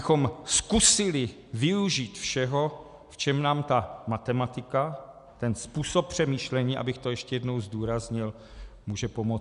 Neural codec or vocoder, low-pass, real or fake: none; 10.8 kHz; real